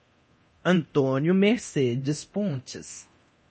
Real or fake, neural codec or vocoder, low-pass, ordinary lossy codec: fake; codec, 24 kHz, 0.9 kbps, DualCodec; 10.8 kHz; MP3, 32 kbps